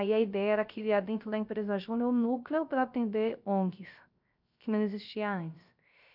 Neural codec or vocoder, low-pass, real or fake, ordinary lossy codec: codec, 16 kHz, 0.3 kbps, FocalCodec; 5.4 kHz; fake; none